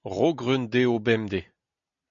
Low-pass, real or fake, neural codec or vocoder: 7.2 kHz; real; none